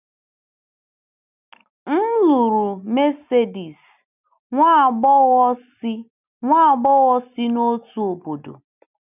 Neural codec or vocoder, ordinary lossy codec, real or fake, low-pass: none; none; real; 3.6 kHz